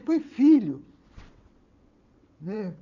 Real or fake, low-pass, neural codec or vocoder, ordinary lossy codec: fake; 7.2 kHz; vocoder, 22.05 kHz, 80 mel bands, WaveNeXt; none